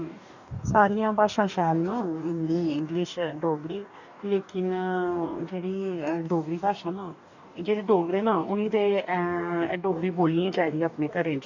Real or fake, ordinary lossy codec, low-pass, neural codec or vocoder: fake; none; 7.2 kHz; codec, 44.1 kHz, 2.6 kbps, DAC